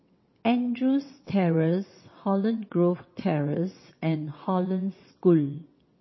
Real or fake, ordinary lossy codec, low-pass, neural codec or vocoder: fake; MP3, 24 kbps; 7.2 kHz; vocoder, 22.05 kHz, 80 mel bands, WaveNeXt